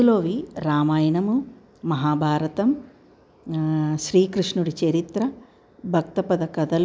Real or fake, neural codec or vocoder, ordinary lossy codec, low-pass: real; none; none; none